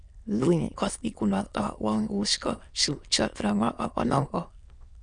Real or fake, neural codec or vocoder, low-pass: fake; autoencoder, 22.05 kHz, a latent of 192 numbers a frame, VITS, trained on many speakers; 9.9 kHz